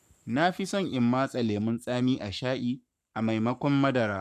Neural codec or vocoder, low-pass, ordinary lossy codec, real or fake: codec, 44.1 kHz, 7.8 kbps, Pupu-Codec; 14.4 kHz; none; fake